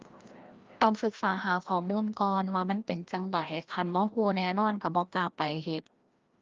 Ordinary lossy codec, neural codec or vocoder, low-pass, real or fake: Opus, 32 kbps; codec, 16 kHz, 1 kbps, FreqCodec, larger model; 7.2 kHz; fake